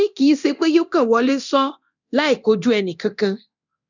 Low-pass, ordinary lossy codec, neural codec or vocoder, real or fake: 7.2 kHz; none; codec, 24 kHz, 0.9 kbps, DualCodec; fake